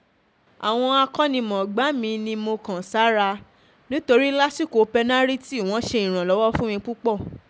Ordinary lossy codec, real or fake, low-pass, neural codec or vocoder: none; real; none; none